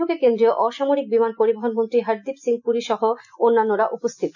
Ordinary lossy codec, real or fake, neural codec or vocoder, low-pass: none; real; none; 7.2 kHz